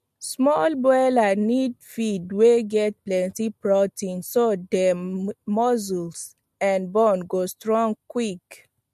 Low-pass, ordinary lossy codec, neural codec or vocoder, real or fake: 14.4 kHz; MP3, 64 kbps; none; real